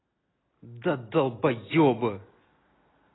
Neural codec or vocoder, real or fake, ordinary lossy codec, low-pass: none; real; AAC, 16 kbps; 7.2 kHz